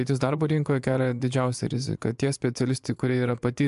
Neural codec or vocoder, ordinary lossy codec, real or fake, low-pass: vocoder, 24 kHz, 100 mel bands, Vocos; AAC, 96 kbps; fake; 10.8 kHz